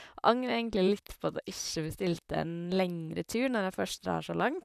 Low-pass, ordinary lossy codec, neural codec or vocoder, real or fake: 14.4 kHz; none; vocoder, 44.1 kHz, 128 mel bands, Pupu-Vocoder; fake